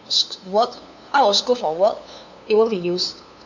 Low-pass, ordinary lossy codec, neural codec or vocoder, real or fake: 7.2 kHz; none; codec, 16 kHz, 2 kbps, FunCodec, trained on LibriTTS, 25 frames a second; fake